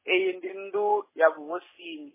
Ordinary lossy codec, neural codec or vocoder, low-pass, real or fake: MP3, 16 kbps; none; 3.6 kHz; real